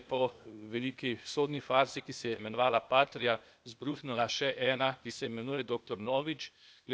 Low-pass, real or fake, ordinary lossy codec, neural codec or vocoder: none; fake; none; codec, 16 kHz, 0.8 kbps, ZipCodec